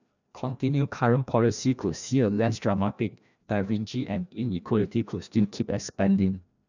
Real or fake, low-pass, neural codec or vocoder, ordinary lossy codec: fake; 7.2 kHz; codec, 16 kHz, 1 kbps, FreqCodec, larger model; none